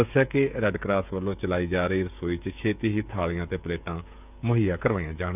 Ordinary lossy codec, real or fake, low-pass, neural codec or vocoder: none; fake; 3.6 kHz; codec, 16 kHz, 16 kbps, FreqCodec, smaller model